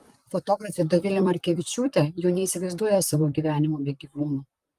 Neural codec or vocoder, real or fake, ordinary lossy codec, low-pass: vocoder, 44.1 kHz, 128 mel bands, Pupu-Vocoder; fake; Opus, 32 kbps; 14.4 kHz